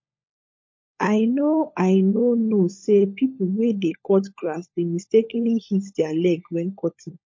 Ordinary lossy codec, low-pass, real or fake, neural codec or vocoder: MP3, 32 kbps; 7.2 kHz; fake; codec, 16 kHz, 16 kbps, FunCodec, trained on LibriTTS, 50 frames a second